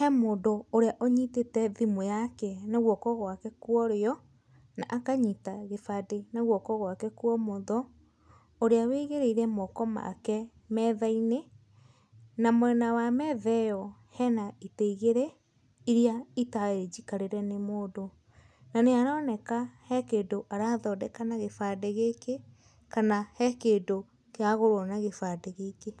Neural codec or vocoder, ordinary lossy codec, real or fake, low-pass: none; none; real; none